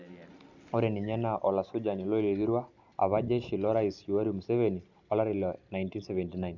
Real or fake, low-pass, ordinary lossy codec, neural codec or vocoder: real; 7.2 kHz; none; none